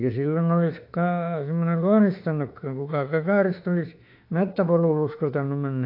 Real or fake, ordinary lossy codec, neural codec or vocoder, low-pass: fake; AAC, 32 kbps; autoencoder, 48 kHz, 32 numbers a frame, DAC-VAE, trained on Japanese speech; 5.4 kHz